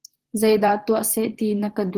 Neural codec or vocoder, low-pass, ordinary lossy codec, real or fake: vocoder, 44.1 kHz, 128 mel bands, Pupu-Vocoder; 14.4 kHz; Opus, 16 kbps; fake